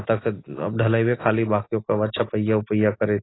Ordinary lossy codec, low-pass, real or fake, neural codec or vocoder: AAC, 16 kbps; 7.2 kHz; real; none